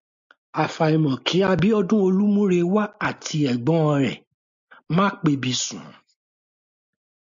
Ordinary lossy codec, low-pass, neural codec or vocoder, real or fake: MP3, 64 kbps; 7.2 kHz; none; real